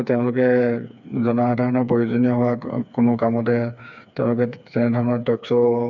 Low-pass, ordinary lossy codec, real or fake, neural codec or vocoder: 7.2 kHz; MP3, 64 kbps; fake; codec, 16 kHz, 4 kbps, FreqCodec, smaller model